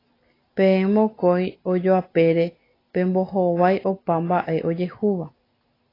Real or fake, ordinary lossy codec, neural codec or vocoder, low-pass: real; AAC, 24 kbps; none; 5.4 kHz